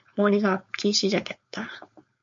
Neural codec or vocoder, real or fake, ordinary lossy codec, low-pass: codec, 16 kHz, 4.8 kbps, FACodec; fake; MP3, 48 kbps; 7.2 kHz